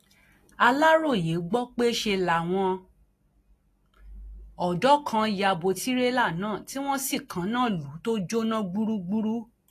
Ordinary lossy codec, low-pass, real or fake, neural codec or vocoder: AAC, 48 kbps; 14.4 kHz; real; none